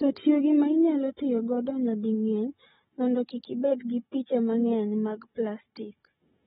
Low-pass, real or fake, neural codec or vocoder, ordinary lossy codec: 7.2 kHz; fake; codec, 16 kHz, 16 kbps, FunCodec, trained on Chinese and English, 50 frames a second; AAC, 16 kbps